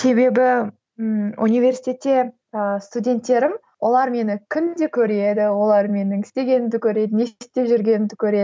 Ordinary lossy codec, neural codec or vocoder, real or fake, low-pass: none; none; real; none